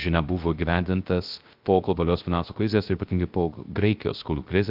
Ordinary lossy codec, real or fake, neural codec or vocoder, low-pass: Opus, 32 kbps; fake; codec, 16 kHz, 0.3 kbps, FocalCodec; 5.4 kHz